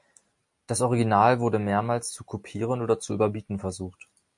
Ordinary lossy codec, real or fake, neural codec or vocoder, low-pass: MP3, 64 kbps; real; none; 10.8 kHz